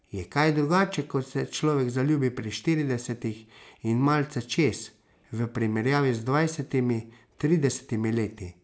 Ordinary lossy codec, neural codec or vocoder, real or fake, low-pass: none; none; real; none